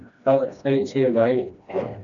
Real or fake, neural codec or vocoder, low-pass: fake; codec, 16 kHz, 1 kbps, FreqCodec, smaller model; 7.2 kHz